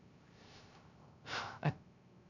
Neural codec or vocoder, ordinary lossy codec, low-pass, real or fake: codec, 16 kHz, 0.3 kbps, FocalCodec; none; 7.2 kHz; fake